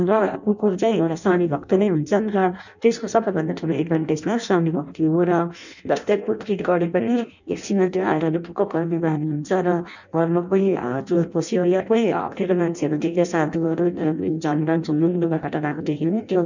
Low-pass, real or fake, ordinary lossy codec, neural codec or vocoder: 7.2 kHz; fake; none; codec, 16 kHz in and 24 kHz out, 0.6 kbps, FireRedTTS-2 codec